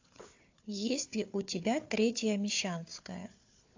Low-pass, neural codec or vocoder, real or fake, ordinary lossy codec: 7.2 kHz; codec, 16 kHz, 4 kbps, FunCodec, trained on Chinese and English, 50 frames a second; fake; AAC, 48 kbps